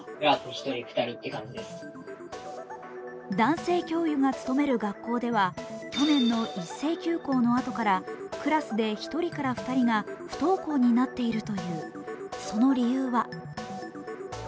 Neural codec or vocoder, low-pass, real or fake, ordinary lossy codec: none; none; real; none